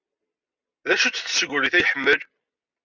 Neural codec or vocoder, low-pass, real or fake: none; 7.2 kHz; real